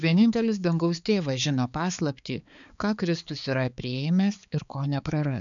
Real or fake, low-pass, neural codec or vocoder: fake; 7.2 kHz; codec, 16 kHz, 4 kbps, X-Codec, HuBERT features, trained on balanced general audio